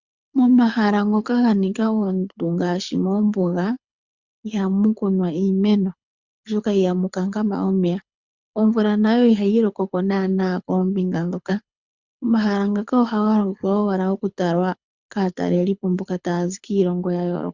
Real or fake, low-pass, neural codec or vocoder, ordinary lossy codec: fake; 7.2 kHz; codec, 24 kHz, 6 kbps, HILCodec; Opus, 64 kbps